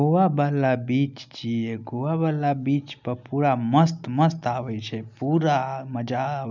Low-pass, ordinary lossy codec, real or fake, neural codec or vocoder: 7.2 kHz; none; real; none